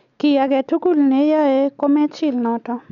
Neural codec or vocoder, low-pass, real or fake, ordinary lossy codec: none; 7.2 kHz; real; none